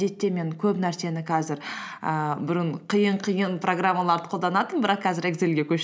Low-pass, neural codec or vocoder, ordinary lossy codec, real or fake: none; none; none; real